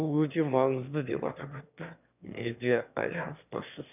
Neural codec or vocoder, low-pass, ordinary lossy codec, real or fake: autoencoder, 22.05 kHz, a latent of 192 numbers a frame, VITS, trained on one speaker; 3.6 kHz; none; fake